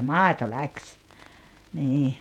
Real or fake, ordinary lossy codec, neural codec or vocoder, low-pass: fake; none; vocoder, 48 kHz, 128 mel bands, Vocos; 19.8 kHz